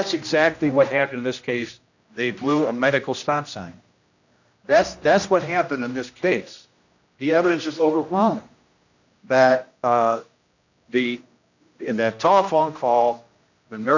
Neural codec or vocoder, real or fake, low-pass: codec, 16 kHz, 1 kbps, X-Codec, HuBERT features, trained on general audio; fake; 7.2 kHz